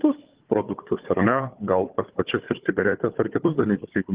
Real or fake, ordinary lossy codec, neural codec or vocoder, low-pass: fake; Opus, 16 kbps; codec, 16 kHz, 4 kbps, FunCodec, trained on Chinese and English, 50 frames a second; 3.6 kHz